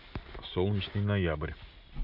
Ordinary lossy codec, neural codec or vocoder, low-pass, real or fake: none; none; 5.4 kHz; real